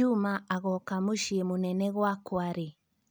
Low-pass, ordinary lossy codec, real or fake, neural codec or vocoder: none; none; real; none